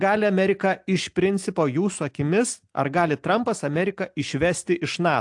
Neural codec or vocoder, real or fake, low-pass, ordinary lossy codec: none; real; 10.8 kHz; AAC, 64 kbps